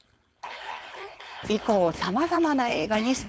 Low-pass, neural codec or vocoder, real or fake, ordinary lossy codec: none; codec, 16 kHz, 4.8 kbps, FACodec; fake; none